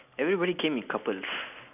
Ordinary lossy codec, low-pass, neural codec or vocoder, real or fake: none; 3.6 kHz; none; real